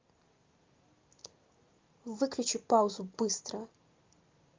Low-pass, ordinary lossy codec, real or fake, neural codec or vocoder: 7.2 kHz; Opus, 32 kbps; real; none